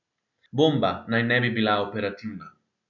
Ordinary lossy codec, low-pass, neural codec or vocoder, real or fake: none; 7.2 kHz; none; real